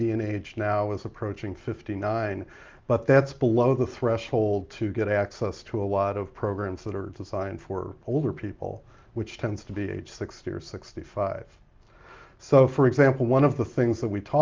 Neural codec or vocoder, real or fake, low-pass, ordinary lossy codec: none; real; 7.2 kHz; Opus, 32 kbps